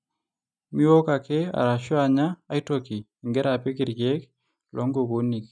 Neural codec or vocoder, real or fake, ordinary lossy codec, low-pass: none; real; none; none